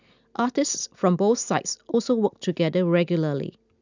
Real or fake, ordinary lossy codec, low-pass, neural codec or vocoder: real; none; 7.2 kHz; none